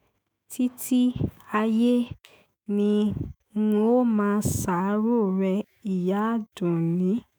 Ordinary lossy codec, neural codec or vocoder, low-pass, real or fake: none; autoencoder, 48 kHz, 128 numbers a frame, DAC-VAE, trained on Japanese speech; none; fake